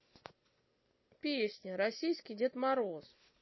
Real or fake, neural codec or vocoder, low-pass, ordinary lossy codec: real; none; 7.2 kHz; MP3, 24 kbps